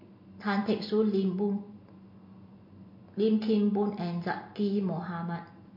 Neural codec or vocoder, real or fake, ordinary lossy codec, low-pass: none; real; MP3, 32 kbps; 5.4 kHz